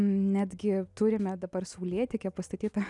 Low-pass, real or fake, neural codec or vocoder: 10.8 kHz; real; none